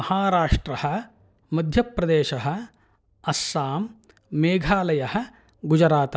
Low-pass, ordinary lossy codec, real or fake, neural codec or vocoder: none; none; real; none